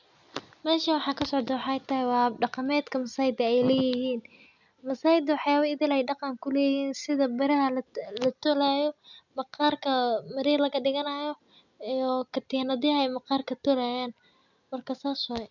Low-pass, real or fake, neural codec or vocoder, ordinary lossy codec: 7.2 kHz; real; none; none